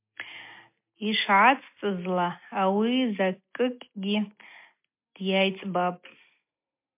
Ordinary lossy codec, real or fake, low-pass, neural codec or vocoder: MP3, 32 kbps; real; 3.6 kHz; none